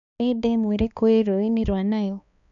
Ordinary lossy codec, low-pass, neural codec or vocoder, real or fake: none; 7.2 kHz; codec, 16 kHz, 2 kbps, X-Codec, HuBERT features, trained on balanced general audio; fake